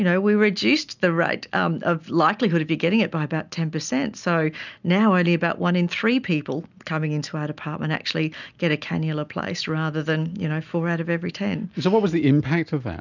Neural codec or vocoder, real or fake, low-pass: none; real; 7.2 kHz